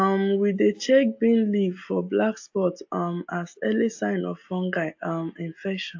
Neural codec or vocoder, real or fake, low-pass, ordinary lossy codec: none; real; 7.2 kHz; AAC, 48 kbps